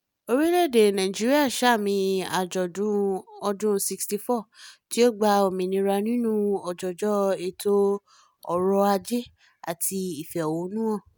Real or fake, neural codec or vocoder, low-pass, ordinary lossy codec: real; none; none; none